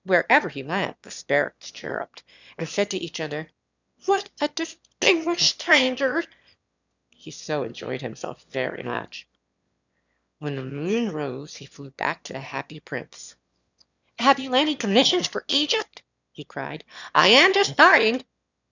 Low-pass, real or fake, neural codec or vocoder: 7.2 kHz; fake; autoencoder, 22.05 kHz, a latent of 192 numbers a frame, VITS, trained on one speaker